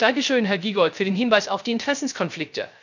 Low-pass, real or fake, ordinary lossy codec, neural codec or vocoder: 7.2 kHz; fake; none; codec, 16 kHz, 0.3 kbps, FocalCodec